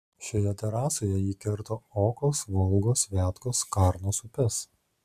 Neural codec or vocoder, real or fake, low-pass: none; real; 14.4 kHz